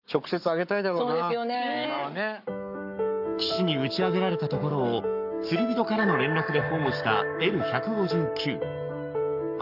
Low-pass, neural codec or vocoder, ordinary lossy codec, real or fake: 5.4 kHz; codec, 44.1 kHz, 7.8 kbps, Pupu-Codec; none; fake